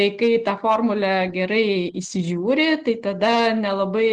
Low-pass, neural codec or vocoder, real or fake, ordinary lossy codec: 9.9 kHz; none; real; Opus, 16 kbps